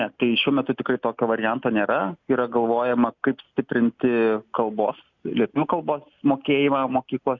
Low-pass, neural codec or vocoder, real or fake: 7.2 kHz; none; real